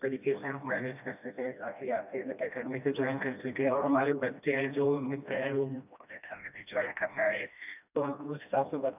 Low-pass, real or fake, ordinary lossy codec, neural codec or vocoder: 3.6 kHz; fake; none; codec, 16 kHz, 1 kbps, FreqCodec, smaller model